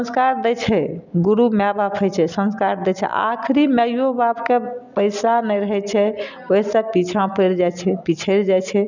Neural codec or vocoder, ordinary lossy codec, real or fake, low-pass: none; none; real; 7.2 kHz